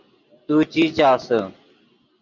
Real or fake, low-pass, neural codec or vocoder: real; 7.2 kHz; none